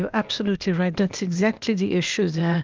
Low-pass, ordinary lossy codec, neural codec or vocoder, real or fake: 7.2 kHz; Opus, 24 kbps; codec, 16 kHz, 0.8 kbps, ZipCodec; fake